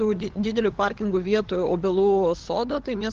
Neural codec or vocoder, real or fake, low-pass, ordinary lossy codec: codec, 16 kHz, 8 kbps, FunCodec, trained on LibriTTS, 25 frames a second; fake; 7.2 kHz; Opus, 16 kbps